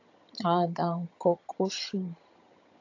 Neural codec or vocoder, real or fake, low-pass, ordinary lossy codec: vocoder, 22.05 kHz, 80 mel bands, HiFi-GAN; fake; 7.2 kHz; AAC, 48 kbps